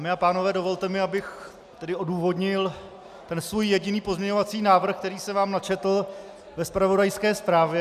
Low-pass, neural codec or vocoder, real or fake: 14.4 kHz; none; real